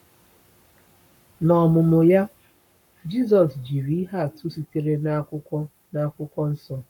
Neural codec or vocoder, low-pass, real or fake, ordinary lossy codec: codec, 44.1 kHz, 7.8 kbps, Pupu-Codec; 19.8 kHz; fake; none